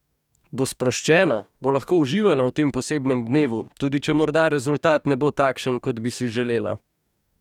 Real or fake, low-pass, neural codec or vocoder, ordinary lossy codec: fake; 19.8 kHz; codec, 44.1 kHz, 2.6 kbps, DAC; none